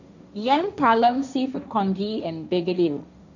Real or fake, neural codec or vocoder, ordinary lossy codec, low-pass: fake; codec, 16 kHz, 1.1 kbps, Voila-Tokenizer; none; 7.2 kHz